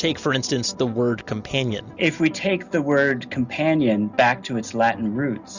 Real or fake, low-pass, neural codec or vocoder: real; 7.2 kHz; none